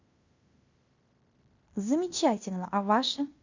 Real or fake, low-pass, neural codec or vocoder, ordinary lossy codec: fake; 7.2 kHz; codec, 16 kHz in and 24 kHz out, 0.9 kbps, LongCat-Audio-Codec, fine tuned four codebook decoder; Opus, 64 kbps